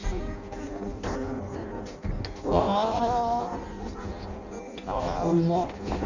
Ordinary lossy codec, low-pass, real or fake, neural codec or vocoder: none; 7.2 kHz; fake; codec, 16 kHz in and 24 kHz out, 0.6 kbps, FireRedTTS-2 codec